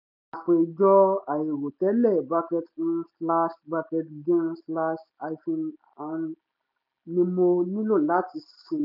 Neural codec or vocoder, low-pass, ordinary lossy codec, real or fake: none; 5.4 kHz; none; real